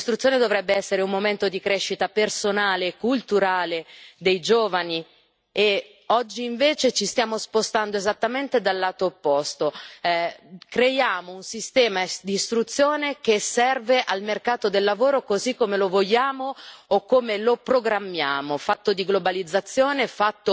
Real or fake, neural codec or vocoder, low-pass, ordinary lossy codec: real; none; none; none